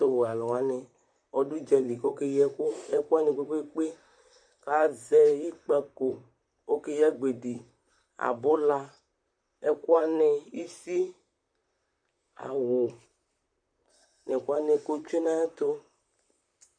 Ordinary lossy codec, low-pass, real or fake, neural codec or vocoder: MP3, 64 kbps; 9.9 kHz; fake; vocoder, 44.1 kHz, 128 mel bands, Pupu-Vocoder